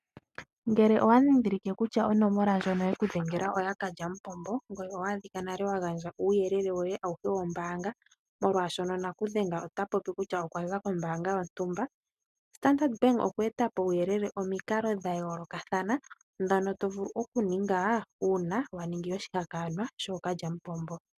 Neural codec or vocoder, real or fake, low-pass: none; real; 14.4 kHz